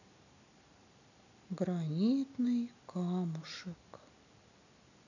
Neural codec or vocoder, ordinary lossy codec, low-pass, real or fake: none; none; 7.2 kHz; real